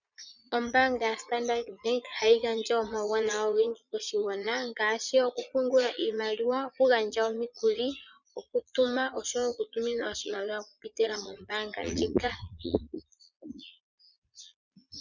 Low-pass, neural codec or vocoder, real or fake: 7.2 kHz; vocoder, 22.05 kHz, 80 mel bands, Vocos; fake